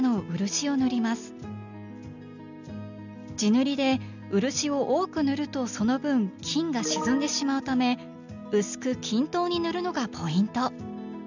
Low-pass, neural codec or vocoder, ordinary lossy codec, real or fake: 7.2 kHz; none; none; real